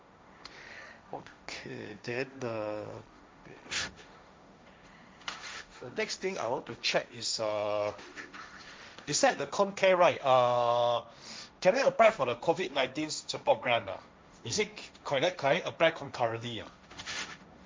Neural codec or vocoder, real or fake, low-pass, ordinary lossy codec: codec, 16 kHz, 1.1 kbps, Voila-Tokenizer; fake; none; none